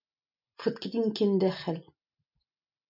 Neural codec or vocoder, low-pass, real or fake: none; 5.4 kHz; real